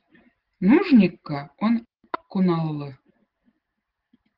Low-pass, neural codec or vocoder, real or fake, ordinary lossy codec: 5.4 kHz; none; real; Opus, 16 kbps